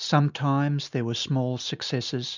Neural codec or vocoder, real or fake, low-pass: none; real; 7.2 kHz